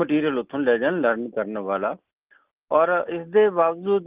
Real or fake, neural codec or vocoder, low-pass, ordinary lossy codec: real; none; 3.6 kHz; Opus, 32 kbps